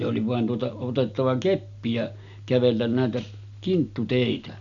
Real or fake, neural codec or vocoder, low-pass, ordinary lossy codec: real; none; 7.2 kHz; none